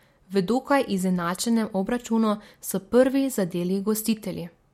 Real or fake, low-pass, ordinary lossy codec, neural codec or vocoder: real; 19.8 kHz; MP3, 64 kbps; none